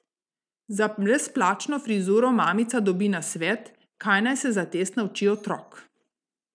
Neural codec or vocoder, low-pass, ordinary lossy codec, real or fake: none; 9.9 kHz; none; real